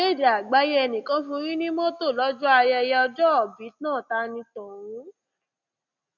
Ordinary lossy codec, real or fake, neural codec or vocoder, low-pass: none; real; none; 7.2 kHz